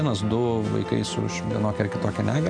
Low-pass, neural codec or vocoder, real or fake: 9.9 kHz; none; real